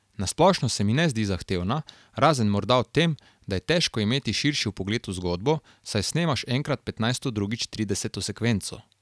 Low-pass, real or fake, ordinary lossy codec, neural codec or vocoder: none; real; none; none